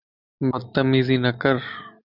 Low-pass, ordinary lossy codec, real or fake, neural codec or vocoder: 5.4 kHz; Opus, 64 kbps; real; none